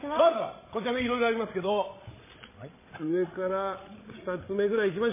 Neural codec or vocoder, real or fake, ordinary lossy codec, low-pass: codec, 16 kHz, 16 kbps, FreqCodec, larger model; fake; MP3, 16 kbps; 3.6 kHz